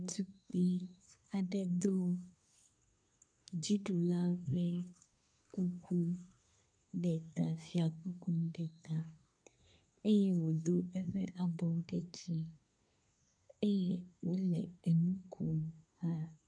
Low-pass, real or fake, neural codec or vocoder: 9.9 kHz; fake; codec, 24 kHz, 1 kbps, SNAC